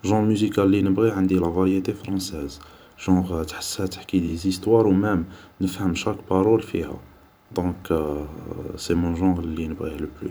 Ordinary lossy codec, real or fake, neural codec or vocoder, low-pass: none; fake; vocoder, 44.1 kHz, 128 mel bands every 512 samples, BigVGAN v2; none